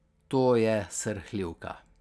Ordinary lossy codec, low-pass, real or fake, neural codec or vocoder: none; none; real; none